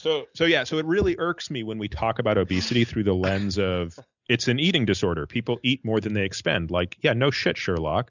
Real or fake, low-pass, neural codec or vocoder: real; 7.2 kHz; none